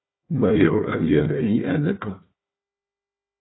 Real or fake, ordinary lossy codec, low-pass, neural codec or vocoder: fake; AAC, 16 kbps; 7.2 kHz; codec, 16 kHz, 1 kbps, FunCodec, trained on Chinese and English, 50 frames a second